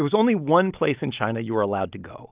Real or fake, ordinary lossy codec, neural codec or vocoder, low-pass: real; Opus, 24 kbps; none; 3.6 kHz